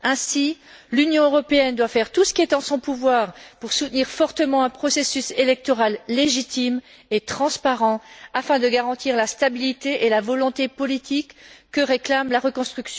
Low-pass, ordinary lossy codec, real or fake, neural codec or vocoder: none; none; real; none